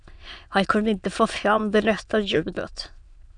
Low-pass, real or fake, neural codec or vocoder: 9.9 kHz; fake; autoencoder, 22.05 kHz, a latent of 192 numbers a frame, VITS, trained on many speakers